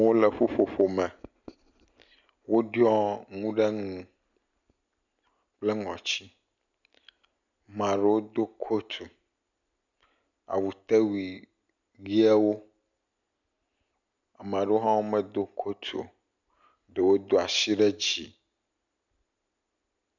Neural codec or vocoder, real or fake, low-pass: none; real; 7.2 kHz